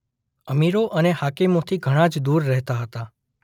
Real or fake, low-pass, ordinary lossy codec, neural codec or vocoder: real; 19.8 kHz; none; none